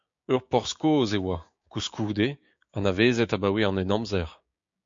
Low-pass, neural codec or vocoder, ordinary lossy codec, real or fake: 7.2 kHz; codec, 16 kHz, 6 kbps, DAC; MP3, 48 kbps; fake